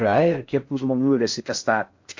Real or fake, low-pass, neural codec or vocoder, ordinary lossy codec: fake; 7.2 kHz; codec, 16 kHz in and 24 kHz out, 0.6 kbps, FocalCodec, streaming, 4096 codes; MP3, 48 kbps